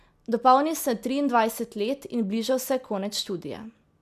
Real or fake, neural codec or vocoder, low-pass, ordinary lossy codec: real; none; 14.4 kHz; none